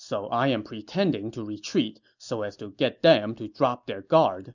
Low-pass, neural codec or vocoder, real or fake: 7.2 kHz; none; real